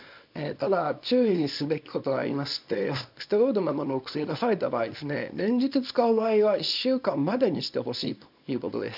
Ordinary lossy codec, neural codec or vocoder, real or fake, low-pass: none; codec, 24 kHz, 0.9 kbps, WavTokenizer, small release; fake; 5.4 kHz